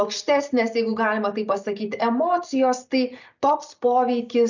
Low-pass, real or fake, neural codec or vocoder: 7.2 kHz; real; none